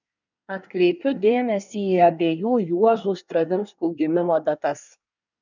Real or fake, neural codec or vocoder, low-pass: fake; codec, 24 kHz, 1 kbps, SNAC; 7.2 kHz